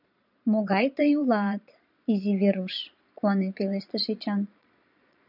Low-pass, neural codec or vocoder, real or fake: 5.4 kHz; vocoder, 22.05 kHz, 80 mel bands, Vocos; fake